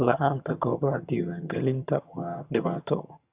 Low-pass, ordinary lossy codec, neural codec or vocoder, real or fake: 3.6 kHz; Opus, 64 kbps; vocoder, 22.05 kHz, 80 mel bands, HiFi-GAN; fake